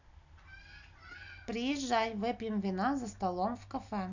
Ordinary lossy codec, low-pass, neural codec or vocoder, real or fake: none; 7.2 kHz; none; real